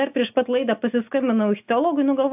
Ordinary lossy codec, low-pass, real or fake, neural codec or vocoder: AAC, 32 kbps; 3.6 kHz; real; none